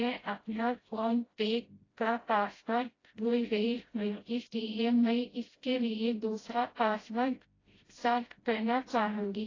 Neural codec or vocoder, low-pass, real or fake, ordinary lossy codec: codec, 16 kHz, 0.5 kbps, FreqCodec, smaller model; 7.2 kHz; fake; AAC, 32 kbps